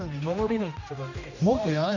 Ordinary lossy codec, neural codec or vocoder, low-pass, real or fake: none; codec, 16 kHz, 1 kbps, X-Codec, HuBERT features, trained on general audio; 7.2 kHz; fake